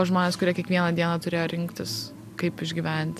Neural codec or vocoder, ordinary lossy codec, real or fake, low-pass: none; AAC, 96 kbps; real; 14.4 kHz